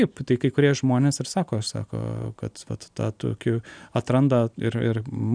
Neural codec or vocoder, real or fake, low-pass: none; real; 9.9 kHz